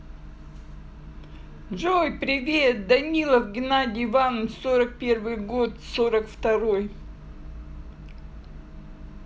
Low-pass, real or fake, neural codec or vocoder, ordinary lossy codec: none; real; none; none